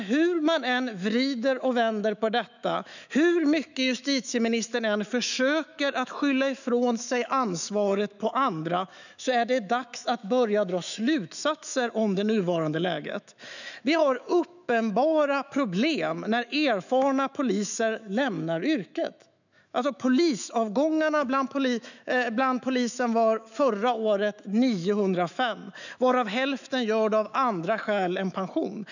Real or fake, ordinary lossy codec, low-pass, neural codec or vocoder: fake; none; 7.2 kHz; autoencoder, 48 kHz, 128 numbers a frame, DAC-VAE, trained on Japanese speech